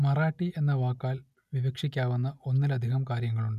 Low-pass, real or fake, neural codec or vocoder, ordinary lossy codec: 14.4 kHz; real; none; none